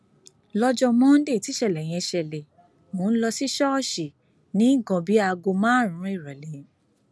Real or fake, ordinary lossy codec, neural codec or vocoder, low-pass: real; none; none; none